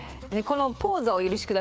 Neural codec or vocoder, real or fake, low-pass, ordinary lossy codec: codec, 16 kHz, 4 kbps, FreqCodec, larger model; fake; none; none